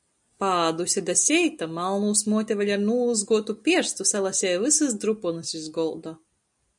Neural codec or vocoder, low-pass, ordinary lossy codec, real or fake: none; 10.8 kHz; MP3, 96 kbps; real